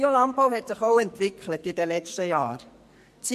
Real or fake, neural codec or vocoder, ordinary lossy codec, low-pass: fake; codec, 44.1 kHz, 2.6 kbps, SNAC; MP3, 64 kbps; 14.4 kHz